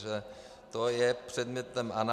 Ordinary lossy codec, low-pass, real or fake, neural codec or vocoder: MP3, 96 kbps; 14.4 kHz; real; none